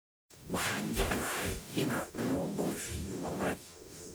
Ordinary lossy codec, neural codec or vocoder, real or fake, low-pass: none; codec, 44.1 kHz, 0.9 kbps, DAC; fake; none